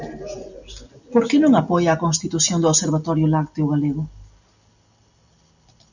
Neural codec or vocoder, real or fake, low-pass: none; real; 7.2 kHz